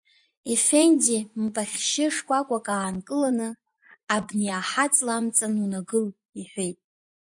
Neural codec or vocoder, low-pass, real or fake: vocoder, 24 kHz, 100 mel bands, Vocos; 10.8 kHz; fake